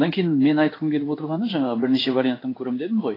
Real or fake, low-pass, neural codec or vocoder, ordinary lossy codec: fake; 5.4 kHz; codec, 16 kHz in and 24 kHz out, 1 kbps, XY-Tokenizer; AAC, 24 kbps